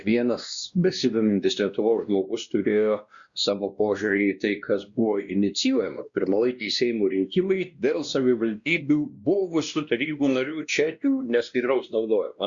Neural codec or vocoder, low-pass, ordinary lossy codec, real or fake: codec, 16 kHz, 1 kbps, X-Codec, WavLM features, trained on Multilingual LibriSpeech; 7.2 kHz; Opus, 64 kbps; fake